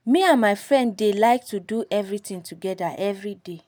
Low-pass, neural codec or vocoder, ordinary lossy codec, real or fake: none; none; none; real